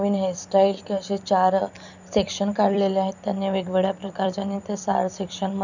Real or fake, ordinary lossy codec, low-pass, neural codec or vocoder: fake; none; 7.2 kHz; vocoder, 44.1 kHz, 128 mel bands every 256 samples, BigVGAN v2